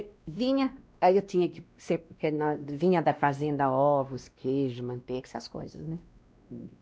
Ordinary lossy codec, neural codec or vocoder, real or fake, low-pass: none; codec, 16 kHz, 1 kbps, X-Codec, WavLM features, trained on Multilingual LibriSpeech; fake; none